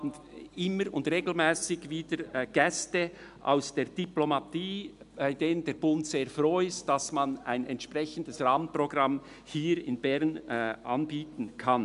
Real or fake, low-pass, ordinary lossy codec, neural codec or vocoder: real; 10.8 kHz; none; none